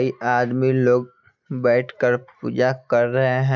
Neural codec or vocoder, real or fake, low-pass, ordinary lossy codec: none; real; 7.2 kHz; none